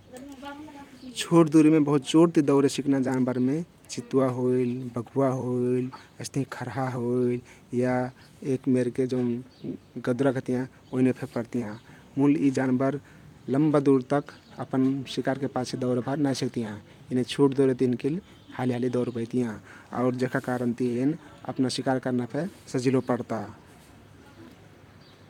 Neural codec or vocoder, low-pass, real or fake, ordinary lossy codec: vocoder, 44.1 kHz, 128 mel bands, Pupu-Vocoder; 19.8 kHz; fake; none